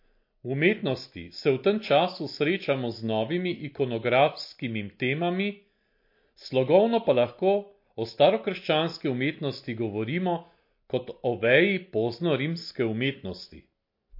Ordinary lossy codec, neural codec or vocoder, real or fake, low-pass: MP3, 32 kbps; none; real; 5.4 kHz